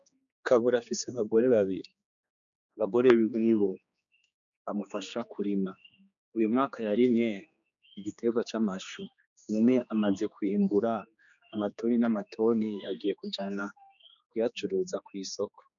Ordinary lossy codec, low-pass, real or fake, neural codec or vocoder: MP3, 96 kbps; 7.2 kHz; fake; codec, 16 kHz, 2 kbps, X-Codec, HuBERT features, trained on general audio